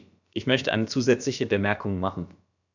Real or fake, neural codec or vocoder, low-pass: fake; codec, 16 kHz, about 1 kbps, DyCAST, with the encoder's durations; 7.2 kHz